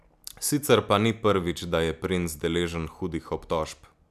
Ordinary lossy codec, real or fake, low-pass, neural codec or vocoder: none; real; 14.4 kHz; none